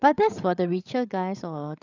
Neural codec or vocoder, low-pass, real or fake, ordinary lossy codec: codec, 16 kHz, 8 kbps, FreqCodec, larger model; 7.2 kHz; fake; none